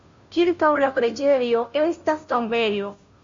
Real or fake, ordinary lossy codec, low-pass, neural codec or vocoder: fake; MP3, 48 kbps; 7.2 kHz; codec, 16 kHz, 0.5 kbps, FunCodec, trained on Chinese and English, 25 frames a second